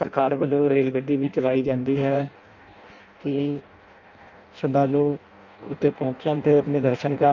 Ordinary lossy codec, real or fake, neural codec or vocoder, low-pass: none; fake; codec, 16 kHz in and 24 kHz out, 0.6 kbps, FireRedTTS-2 codec; 7.2 kHz